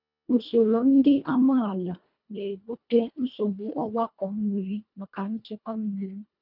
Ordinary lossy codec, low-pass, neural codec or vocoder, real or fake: none; 5.4 kHz; codec, 24 kHz, 1.5 kbps, HILCodec; fake